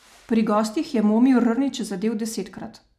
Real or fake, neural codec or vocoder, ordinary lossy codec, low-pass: real; none; none; 14.4 kHz